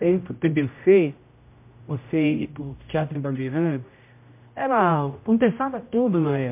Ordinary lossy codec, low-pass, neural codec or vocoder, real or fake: MP3, 32 kbps; 3.6 kHz; codec, 16 kHz, 0.5 kbps, X-Codec, HuBERT features, trained on general audio; fake